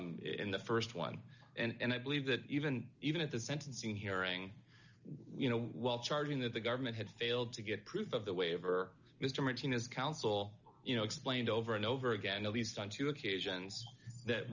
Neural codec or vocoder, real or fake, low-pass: none; real; 7.2 kHz